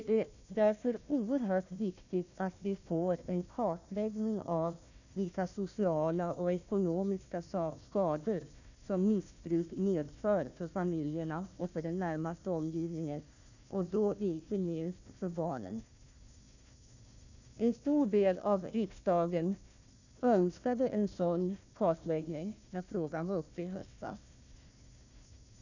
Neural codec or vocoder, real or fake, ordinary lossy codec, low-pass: codec, 16 kHz, 1 kbps, FunCodec, trained on Chinese and English, 50 frames a second; fake; none; 7.2 kHz